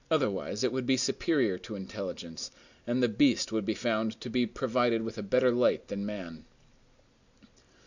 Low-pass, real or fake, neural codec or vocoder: 7.2 kHz; real; none